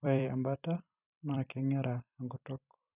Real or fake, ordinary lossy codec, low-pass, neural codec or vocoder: fake; none; 3.6 kHz; vocoder, 44.1 kHz, 128 mel bands every 256 samples, BigVGAN v2